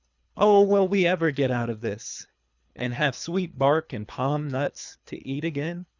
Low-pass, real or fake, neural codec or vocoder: 7.2 kHz; fake; codec, 24 kHz, 3 kbps, HILCodec